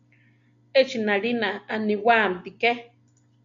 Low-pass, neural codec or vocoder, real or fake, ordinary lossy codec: 7.2 kHz; none; real; MP3, 64 kbps